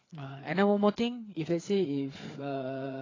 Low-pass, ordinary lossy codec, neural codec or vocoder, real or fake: 7.2 kHz; AAC, 32 kbps; codec, 16 kHz, 4 kbps, FreqCodec, larger model; fake